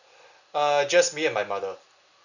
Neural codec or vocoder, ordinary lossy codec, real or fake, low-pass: none; none; real; 7.2 kHz